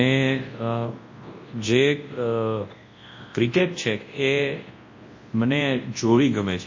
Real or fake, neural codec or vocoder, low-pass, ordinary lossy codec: fake; codec, 24 kHz, 0.9 kbps, WavTokenizer, large speech release; 7.2 kHz; MP3, 32 kbps